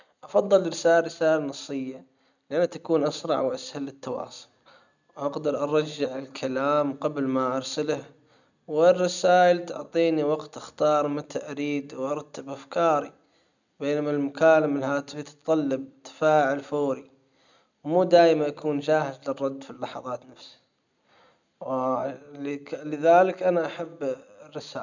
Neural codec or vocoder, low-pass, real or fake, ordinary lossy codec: none; 7.2 kHz; real; none